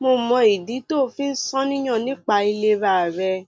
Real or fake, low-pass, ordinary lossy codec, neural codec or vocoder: real; none; none; none